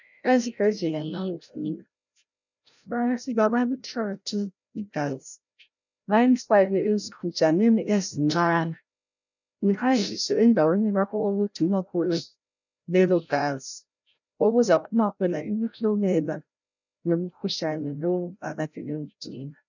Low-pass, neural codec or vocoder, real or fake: 7.2 kHz; codec, 16 kHz, 0.5 kbps, FreqCodec, larger model; fake